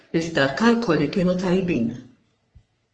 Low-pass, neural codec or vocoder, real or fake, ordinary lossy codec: 9.9 kHz; codec, 44.1 kHz, 3.4 kbps, Pupu-Codec; fake; Opus, 24 kbps